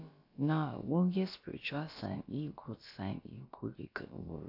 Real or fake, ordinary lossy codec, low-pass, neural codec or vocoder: fake; AAC, 32 kbps; 5.4 kHz; codec, 16 kHz, about 1 kbps, DyCAST, with the encoder's durations